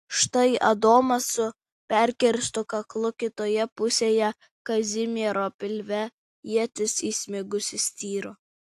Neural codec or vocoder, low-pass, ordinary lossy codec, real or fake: none; 14.4 kHz; AAC, 64 kbps; real